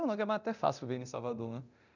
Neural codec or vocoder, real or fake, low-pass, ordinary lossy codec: codec, 24 kHz, 0.9 kbps, DualCodec; fake; 7.2 kHz; none